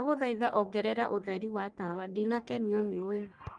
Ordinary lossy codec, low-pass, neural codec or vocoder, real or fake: Opus, 32 kbps; 9.9 kHz; codec, 44.1 kHz, 1.7 kbps, Pupu-Codec; fake